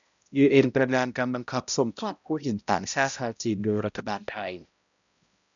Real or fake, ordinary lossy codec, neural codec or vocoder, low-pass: fake; MP3, 96 kbps; codec, 16 kHz, 0.5 kbps, X-Codec, HuBERT features, trained on balanced general audio; 7.2 kHz